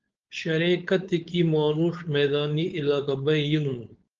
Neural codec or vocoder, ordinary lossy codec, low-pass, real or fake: codec, 16 kHz, 4.8 kbps, FACodec; Opus, 32 kbps; 7.2 kHz; fake